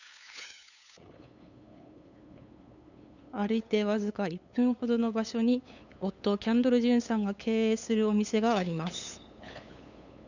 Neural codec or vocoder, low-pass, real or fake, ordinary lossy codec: codec, 16 kHz, 8 kbps, FunCodec, trained on LibriTTS, 25 frames a second; 7.2 kHz; fake; none